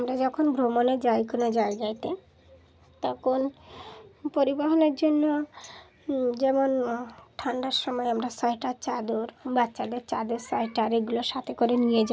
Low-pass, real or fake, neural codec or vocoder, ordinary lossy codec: none; real; none; none